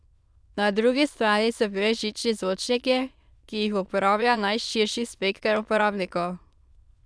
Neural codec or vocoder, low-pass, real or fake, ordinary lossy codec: autoencoder, 22.05 kHz, a latent of 192 numbers a frame, VITS, trained on many speakers; none; fake; none